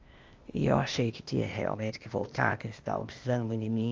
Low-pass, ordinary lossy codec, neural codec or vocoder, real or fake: 7.2 kHz; Opus, 32 kbps; codec, 16 kHz, 0.8 kbps, ZipCodec; fake